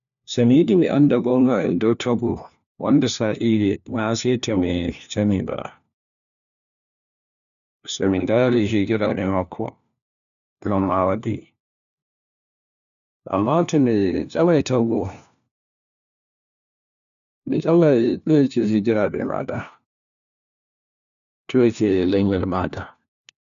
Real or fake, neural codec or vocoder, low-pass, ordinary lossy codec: fake; codec, 16 kHz, 1 kbps, FunCodec, trained on LibriTTS, 50 frames a second; 7.2 kHz; none